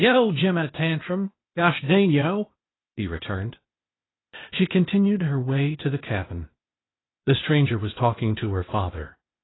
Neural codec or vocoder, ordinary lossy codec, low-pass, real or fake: codec, 16 kHz, 0.8 kbps, ZipCodec; AAC, 16 kbps; 7.2 kHz; fake